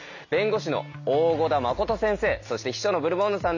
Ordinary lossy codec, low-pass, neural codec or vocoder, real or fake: none; 7.2 kHz; none; real